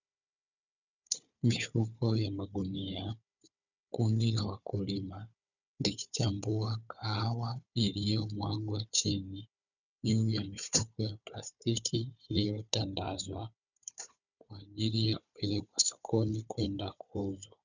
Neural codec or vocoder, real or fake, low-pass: codec, 16 kHz, 4 kbps, FunCodec, trained on Chinese and English, 50 frames a second; fake; 7.2 kHz